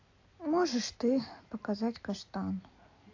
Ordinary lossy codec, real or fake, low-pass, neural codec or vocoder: AAC, 48 kbps; real; 7.2 kHz; none